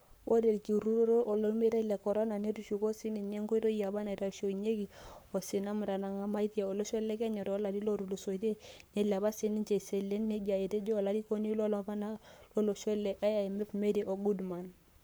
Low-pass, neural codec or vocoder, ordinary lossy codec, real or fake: none; codec, 44.1 kHz, 7.8 kbps, Pupu-Codec; none; fake